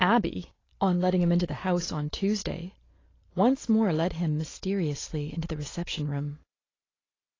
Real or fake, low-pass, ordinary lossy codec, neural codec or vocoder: real; 7.2 kHz; AAC, 32 kbps; none